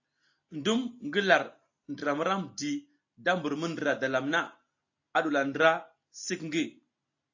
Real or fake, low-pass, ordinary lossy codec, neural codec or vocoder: real; 7.2 kHz; AAC, 48 kbps; none